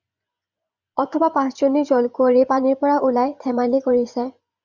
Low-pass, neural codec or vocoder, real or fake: 7.2 kHz; vocoder, 24 kHz, 100 mel bands, Vocos; fake